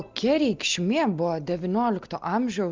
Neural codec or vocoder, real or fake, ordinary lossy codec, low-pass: none; real; Opus, 24 kbps; 7.2 kHz